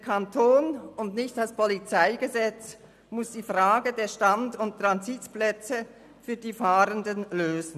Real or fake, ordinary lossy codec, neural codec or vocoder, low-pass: real; none; none; 14.4 kHz